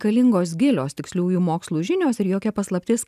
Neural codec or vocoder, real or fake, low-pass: none; real; 14.4 kHz